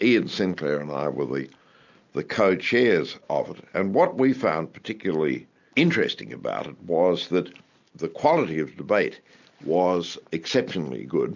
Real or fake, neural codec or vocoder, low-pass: real; none; 7.2 kHz